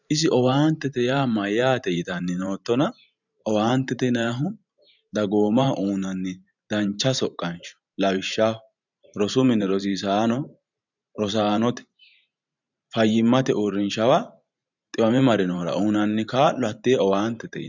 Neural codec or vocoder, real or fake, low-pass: vocoder, 44.1 kHz, 128 mel bands every 512 samples, BigVGAN v2; fake; 7.2 kHz